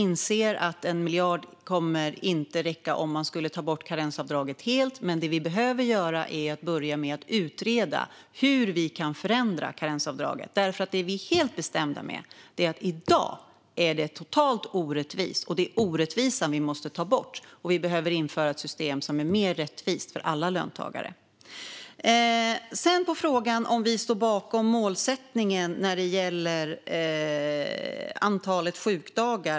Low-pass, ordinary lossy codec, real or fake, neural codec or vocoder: none; none; real; none